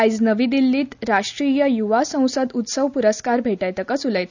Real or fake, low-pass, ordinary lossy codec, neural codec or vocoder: real; 7.2 kHz; none; none